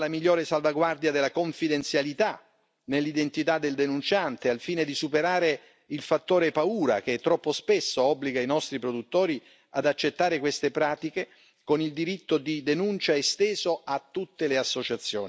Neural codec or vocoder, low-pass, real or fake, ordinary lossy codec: none; none; real; none